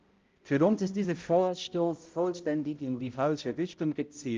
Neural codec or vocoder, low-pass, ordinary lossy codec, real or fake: codec, 16 kHz, 0.5 kbps, X-Codec, HuBERT features, trained on balanced general audio; 7.2 kHz; Opus, 32 kbps; fake